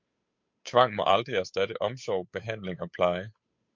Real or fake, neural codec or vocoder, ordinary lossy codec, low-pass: fake; codec, 16 kHz, 8 kbps, FunCodec, trained on Chinese and English, 25 frames a second; MP3, 48 kbps; 7.2 kHz